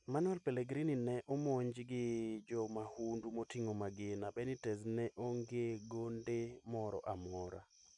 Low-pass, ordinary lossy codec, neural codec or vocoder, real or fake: 10.8 kHz; none; none; real